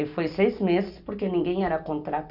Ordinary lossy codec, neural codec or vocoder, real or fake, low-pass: none; none; real; 5.4 kHz